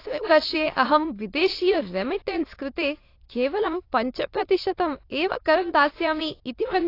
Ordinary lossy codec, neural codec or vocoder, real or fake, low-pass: AAC, 32 kbps; autoencoder, 22.05 kHz, a latent of 192 numbers a frame, VITS, trained on many speakers; fake; 5.4 kHz